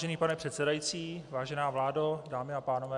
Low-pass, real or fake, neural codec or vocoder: 10.8 kHz; real; none